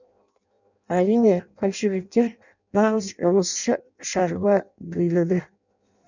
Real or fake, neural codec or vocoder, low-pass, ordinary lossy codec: fake; codec, 16 kHz in and 24 kHz out, 0.6 kbps, FireRedTTS-2 codec; 7.2 kHz; none